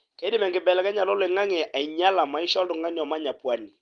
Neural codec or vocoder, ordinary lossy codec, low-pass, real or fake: none; Opus, 24 kbps; 9.9 kHz; real